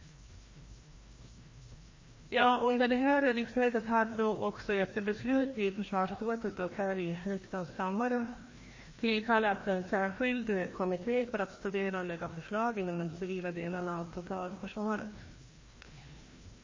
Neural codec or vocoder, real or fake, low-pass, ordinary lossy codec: codec, 16 kHz, 1 kbps, FreqCodec, larger model; fake; 7.2 kHz; MP3, 32 kbps